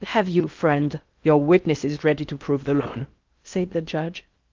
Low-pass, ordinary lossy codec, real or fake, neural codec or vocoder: 7.2 kHz; Opus, 24 kbps; fake; codec, 16 kHz in and 24 kHz out, 0.6 kbps, FocalCodec, streaming, 4096 codes